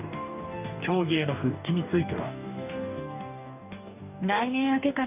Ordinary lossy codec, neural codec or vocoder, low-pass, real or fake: none; codec, 44.1 kHz, 2.6 kbps, DAC; 3.6 kHz; fake